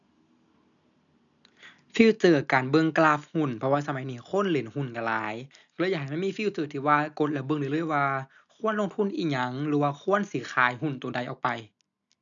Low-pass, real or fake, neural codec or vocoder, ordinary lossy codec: 7.2 kHz; real; none; none